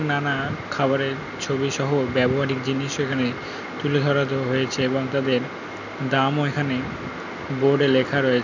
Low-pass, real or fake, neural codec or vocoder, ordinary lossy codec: 7.2 kHz; real; none; none